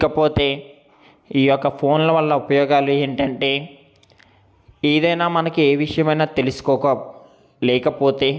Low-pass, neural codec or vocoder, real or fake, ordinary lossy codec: none; none; real; none